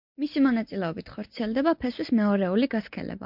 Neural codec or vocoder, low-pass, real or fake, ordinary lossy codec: none; 5.4 kHz; real; MP3, 48 kbps